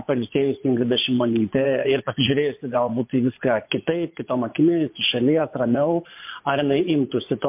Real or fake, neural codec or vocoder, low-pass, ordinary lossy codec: fake; vocoder, 24 kHz, 100 mel bands, Vocos; 3.6 kHz; MP3, 32 kbps